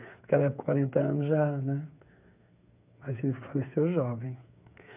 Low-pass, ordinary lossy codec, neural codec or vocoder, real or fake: 3.6 kHz; none; codec, 16 kHz, 8 kbps, FreqCodec, smaller model; fake